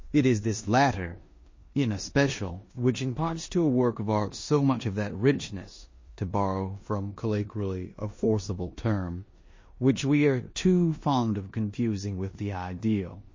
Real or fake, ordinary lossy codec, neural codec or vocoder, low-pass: fake; MP3, 32 kbps; codec, 16 kHz in and 24 kHz out, 0.9 kbps, LongCat-Audio-Codec, four codebook decoder; 7.2 kHz